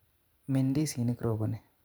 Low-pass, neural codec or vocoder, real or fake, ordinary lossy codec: none; vocoder, 44.1 kHz, 128 mel bands every 512 samples, BigVGAN v2; fake; none